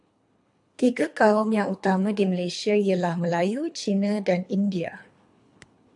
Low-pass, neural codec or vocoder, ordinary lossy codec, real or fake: 10.8 kHz; codec, 24 kHz, 3 kbps, HILCodec; AAC, 64 kbps; fake